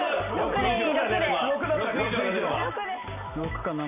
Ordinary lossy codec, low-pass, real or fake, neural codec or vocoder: MP3, 24 kbps; 3.6 kHz; real; none